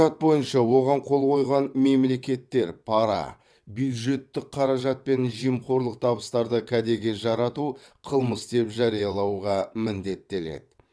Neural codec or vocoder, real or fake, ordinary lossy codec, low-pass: vocoder, 22.05 kHz, 80 mel bands, WaveNeXt; fake; none; none